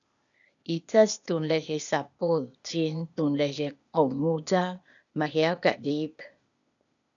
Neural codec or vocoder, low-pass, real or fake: codec, 16 kHz, 0.8 kbps, ZipCodec; 7.2 kHz; fake